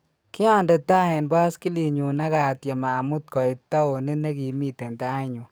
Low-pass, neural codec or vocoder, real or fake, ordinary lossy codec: none; codec, 44.1 kHz, 7.8 kbps, DAC; fake; none